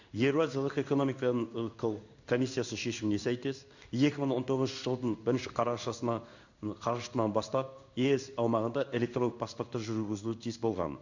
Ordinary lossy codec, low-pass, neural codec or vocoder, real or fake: none; 7.2 kHz; codec, 16 kHz in and 24 kHz out, 1 kbps, XY-Tokenizer; fake